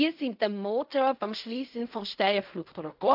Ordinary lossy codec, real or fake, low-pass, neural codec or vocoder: AAC, 48 kbps; fake; 5.4 kHz; codec, 16 kHz in and 24 kHz out, 0.4 kbps, LongCat-Audio-Codec, fine tuned four codebook decoder